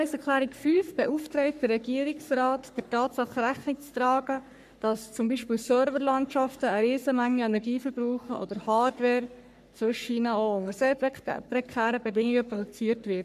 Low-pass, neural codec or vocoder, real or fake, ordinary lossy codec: 14.4 kHz; codec, 44.1 kHz, 3.4 kbps, Pupu-Codec; fake; MP3, 96 kbps